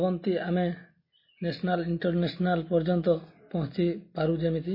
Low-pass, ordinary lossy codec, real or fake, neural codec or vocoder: 5.4 kHz; MP3, 24 kbps; real; none